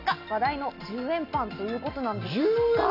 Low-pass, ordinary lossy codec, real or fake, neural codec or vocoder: 5.4 kHz; none; real; none